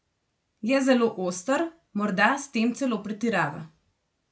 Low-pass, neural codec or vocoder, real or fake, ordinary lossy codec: none; none; real; none